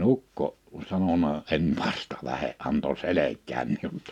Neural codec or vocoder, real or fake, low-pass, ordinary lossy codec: none; real; 19.8 kHz; none